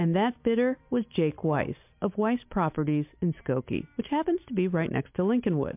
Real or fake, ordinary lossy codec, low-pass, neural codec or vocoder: real; MP3, 32 kbps; 3.6 kHz; none